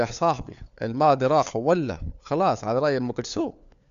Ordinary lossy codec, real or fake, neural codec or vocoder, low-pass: none; fake; codec, 16 kHz, 4 kbps, FunCodec, trained on LibriTTS, 50 frames a second; 7.2 kHz